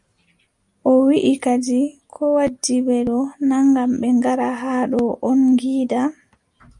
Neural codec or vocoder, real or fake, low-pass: none; real; 10.8 kHz